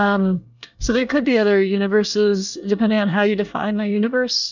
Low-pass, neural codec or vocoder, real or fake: 7.2 kHz; codec, 24 kHz, 1 kbps, SNAC; fake